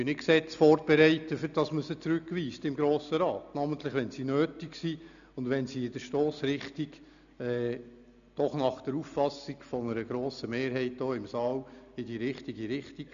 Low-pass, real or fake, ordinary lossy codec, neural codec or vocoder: 7.2 kHz; real; none; none